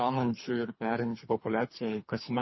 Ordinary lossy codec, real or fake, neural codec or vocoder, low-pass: MP3, 24 kbps; fake; codec, 24 kHz, 3 kbps, HILCodec; 7.2 kHz